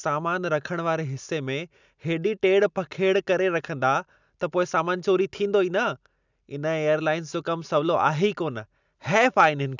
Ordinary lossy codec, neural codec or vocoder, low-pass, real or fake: none; none; 7.2 kHz; real